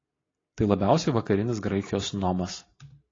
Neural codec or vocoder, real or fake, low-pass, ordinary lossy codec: none; real; 7.2 kHz; AAC, 32 kbps